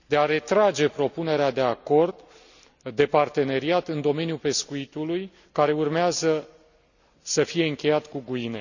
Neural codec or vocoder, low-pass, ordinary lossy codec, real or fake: none; 7.2 kHz; none; real